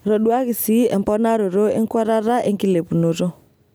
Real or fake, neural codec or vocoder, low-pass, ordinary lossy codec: real; none; none; none